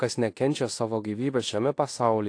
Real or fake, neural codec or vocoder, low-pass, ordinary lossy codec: fake; codec, 16 kHz in and 24 kHz out, 0.9 kbps, LongCat-Audio-Codec, fine tuned four codebook decoder; 9.9 kHz; AAC, 48 kbps